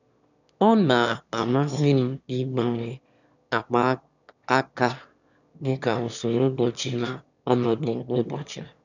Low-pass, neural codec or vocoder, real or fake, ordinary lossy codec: 7.2 kHz; autoencoder, 22.05 kHz, a latent of 192 numbers a frame, VITS, trained on one speaker; fake; none